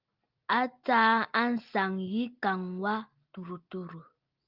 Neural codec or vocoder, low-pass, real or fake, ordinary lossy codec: none; 5.4 kHz; real; Opus, 24 kbps